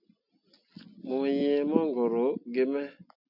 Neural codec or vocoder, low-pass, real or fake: none; 5.4 kHz; real